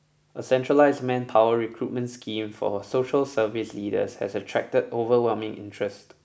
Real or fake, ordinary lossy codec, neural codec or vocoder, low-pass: real; none; none; none